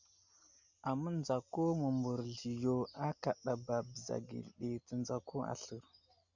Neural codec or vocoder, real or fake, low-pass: none; real; 7.2 kHz